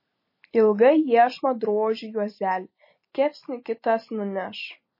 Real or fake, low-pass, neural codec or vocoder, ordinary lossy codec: real; 5.4 kHz; none; MP3, 24 kbps